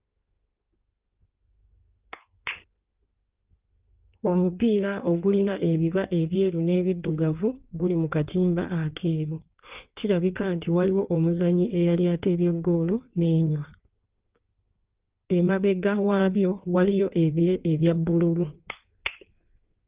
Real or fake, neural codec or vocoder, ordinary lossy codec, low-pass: fake; codec, 16 kHz in and 24 kHz out, 1.1 kbps, FireRedTTS-2 codec; Opus, 32 kbps; 3.6 kHz